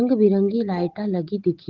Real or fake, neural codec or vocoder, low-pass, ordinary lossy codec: real; none; 7.2 kHz; Opus, 16 kbps